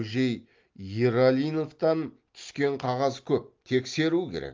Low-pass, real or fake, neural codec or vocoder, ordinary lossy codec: 7.2 kHz; real; none; Opus, 32 kbps